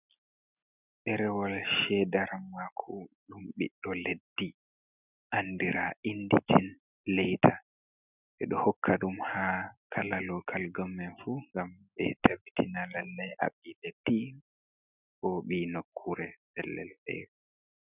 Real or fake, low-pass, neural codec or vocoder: real; 3.6 kHz; none